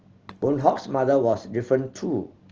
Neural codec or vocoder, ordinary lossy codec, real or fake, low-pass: none; Opus, 16 kbps; real; 7.2 kHz